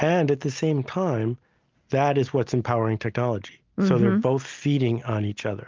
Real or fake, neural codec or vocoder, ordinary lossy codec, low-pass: real; none; Opus, 24 kbps; 7.2 kHz